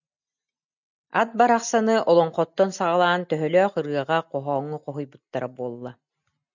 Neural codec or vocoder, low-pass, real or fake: none; 7.2 kHz; real